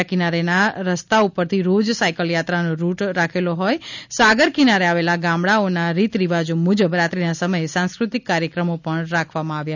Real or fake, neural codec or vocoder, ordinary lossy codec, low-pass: real; none; none; 7.2 kHz